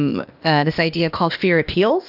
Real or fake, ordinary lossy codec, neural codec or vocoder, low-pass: fake; AAC, 48 kbps; codec, 16 kHz, 0.8 kbps, ZipCodec; 5.4 kHz